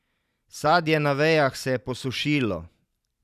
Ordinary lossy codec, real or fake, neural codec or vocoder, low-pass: none; fake; vocoder, 44.1 kHz, 128 mel bands every 512 samples, BigVGAN v2; 14.4 kHz